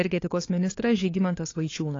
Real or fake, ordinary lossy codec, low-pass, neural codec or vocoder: fake; AAC, 32 kbps; 7.2 kHz; codec, 16 kHz, 4 kbps, FunCodec, trained on LibriTTS, 50 frames a second